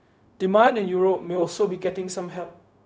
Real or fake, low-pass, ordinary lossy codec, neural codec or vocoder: fake; none; none; codec, 16 kHz, 0.4 kbps, LongCat-Audio-Codec